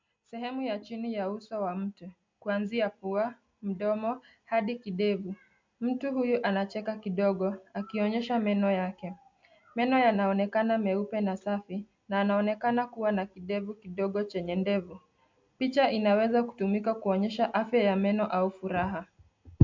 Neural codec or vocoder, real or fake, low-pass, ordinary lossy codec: none; real; 7.2 kHz; MP3, 64 kbps